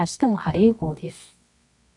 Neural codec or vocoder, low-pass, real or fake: codec, 24 kHz, 0.9 kbps, WavTokenizer, medium music audio release; 10.8 kHz; fake